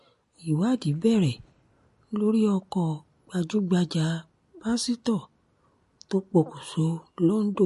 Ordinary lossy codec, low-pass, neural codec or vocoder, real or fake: MP3, 48 kbps; 14.4 kHz; none; real